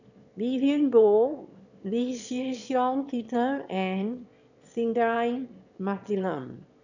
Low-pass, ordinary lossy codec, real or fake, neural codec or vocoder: 7.2 kHz; none; fake; autoencoder, 22.05 kHz, a latent of 192 numbers a frame, VITS, trained on one speaker